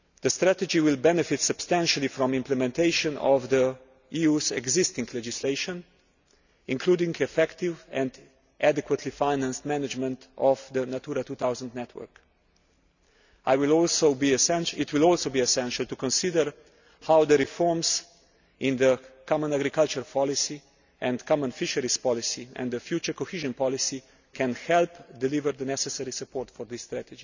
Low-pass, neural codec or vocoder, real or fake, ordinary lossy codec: 7.2 kHz; none; real; none